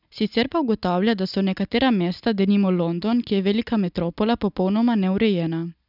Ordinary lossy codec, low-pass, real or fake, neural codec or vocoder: none; 5.4 kHz; real; none